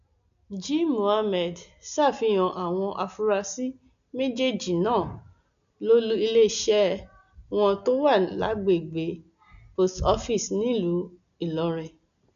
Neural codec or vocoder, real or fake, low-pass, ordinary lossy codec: none; real; 7.2 kHz; none